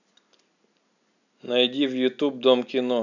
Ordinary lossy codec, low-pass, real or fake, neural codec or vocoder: none; 7.2 kHz; real; none